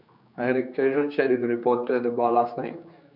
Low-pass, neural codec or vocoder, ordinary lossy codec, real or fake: 5.4 kHz; codec, 16 kHz, 4 kbps, X-Codec, HuBERT features, trained on general audio; none; fake